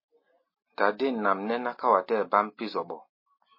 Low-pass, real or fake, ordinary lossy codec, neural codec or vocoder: 5.4 kHz; real; MP3, 24 kbps; none